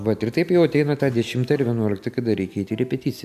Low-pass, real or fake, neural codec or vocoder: 14.4 kHz; real; none